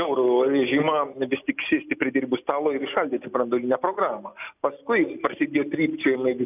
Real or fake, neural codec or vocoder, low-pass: real; none; 3.6 kHz